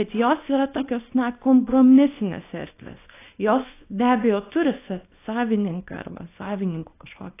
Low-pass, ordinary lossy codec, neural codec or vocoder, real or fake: 3.6 kHz; AAC, 24 kbps; codec, 24 kHz, 0.9 kbps, WavTokenizer, small release; fake